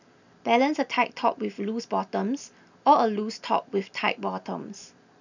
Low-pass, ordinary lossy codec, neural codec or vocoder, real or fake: 7.2 kHz; none; none; real